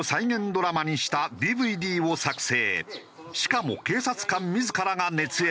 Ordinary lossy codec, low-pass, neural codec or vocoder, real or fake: none; none; none; real